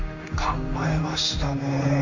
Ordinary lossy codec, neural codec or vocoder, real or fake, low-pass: none; codec, 16 kHz in and 24 kHz out, 1 kbps, XY-Tokenizer; fake; 7.2 kHz